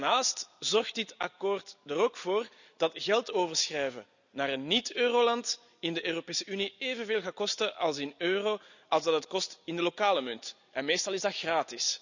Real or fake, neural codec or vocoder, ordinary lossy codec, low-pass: real; none; none; 7.2 kHz